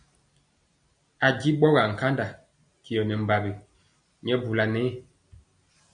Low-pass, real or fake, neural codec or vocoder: 9.9 kHz; real; none